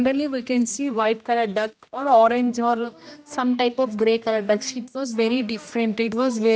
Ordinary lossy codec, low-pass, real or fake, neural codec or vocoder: none; none; fake; codec, 16 kHz, 1 kbps, X-Codec, HuBERT features, trained on general audio